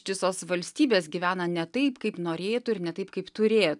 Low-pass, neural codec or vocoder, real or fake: 10.8 kHz; none; real